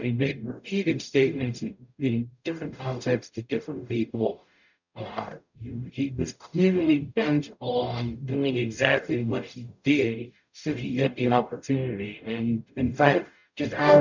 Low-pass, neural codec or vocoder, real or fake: 7.2 kHz; codec, 44.1 kHz, 0.9 kbps, DAC; fake